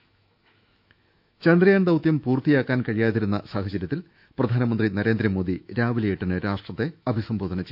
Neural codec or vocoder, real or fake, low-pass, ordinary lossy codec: autoencoder, 48 kHz, 128 numbers a frame, DAC-VAE, trained on Japanese speech; fake; 5.4 kHz; none